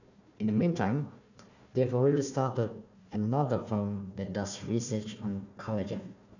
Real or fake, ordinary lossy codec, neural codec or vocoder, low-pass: fake; none; codec, 16 kHz, 1 kbps, FunCodec, trained on Chinese and English, 50 frames a second; 7.2 kHz